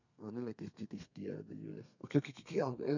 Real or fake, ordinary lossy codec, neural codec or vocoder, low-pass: fake; none; codec, 32 kHz, 1.9 kbps, SNAC; 7.2 kHz